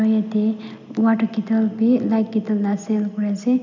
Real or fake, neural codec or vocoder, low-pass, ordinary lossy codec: real; none; 7.2 kHz; MP3, 64 kbps